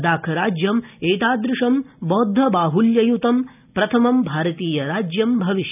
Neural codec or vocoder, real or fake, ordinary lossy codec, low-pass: none; real; none; 3.6 kHz